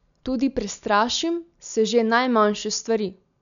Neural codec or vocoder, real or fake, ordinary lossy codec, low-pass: none; real; none; 7.2 kHz